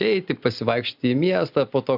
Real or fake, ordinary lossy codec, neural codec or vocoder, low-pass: real; AAC, 48 kbps; none; 5.4 kHz